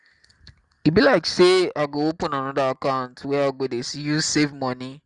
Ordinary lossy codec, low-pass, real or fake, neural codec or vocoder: Opus, 32 kbps; 10.8 kHz; real; none